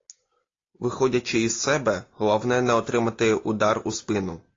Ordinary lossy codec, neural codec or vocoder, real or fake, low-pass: AAC, 32 kbps; none; real; 7.2 kHz